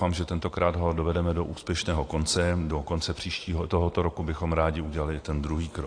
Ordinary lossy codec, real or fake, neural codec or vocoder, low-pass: AAC, 48 kbps; real; none; 9.9 kHz